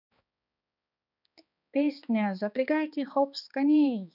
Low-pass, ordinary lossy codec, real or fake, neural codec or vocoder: 5.4 kHz; none; fake; codec, 16 kHz, 2 kbps, X-Codec, HuBERT features, trained on balanced general audio